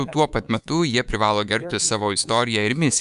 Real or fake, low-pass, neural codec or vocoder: fake; 10.8 kHz; codec, 24 kHz, 3.1 kbps, DualCodec